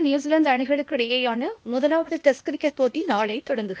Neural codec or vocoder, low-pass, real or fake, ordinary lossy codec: codec, 16 kHz, 0.8 kbps, ZipCodec; none; fake; none